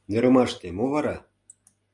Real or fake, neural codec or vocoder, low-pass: real; none; 10.8 kHz